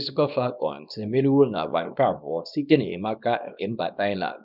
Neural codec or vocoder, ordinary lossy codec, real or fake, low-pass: codec, 24 kHz, 0.9 kbps, WavTokenizer, small release; none; fake; 5.4 kHz